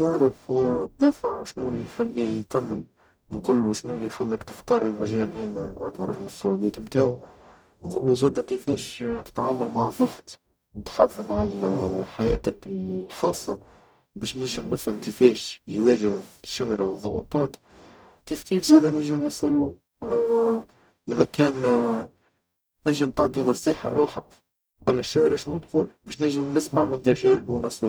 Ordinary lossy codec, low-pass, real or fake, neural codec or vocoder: none; none; fake; codec, 44.1 kHz, 0.9 kbps, DAC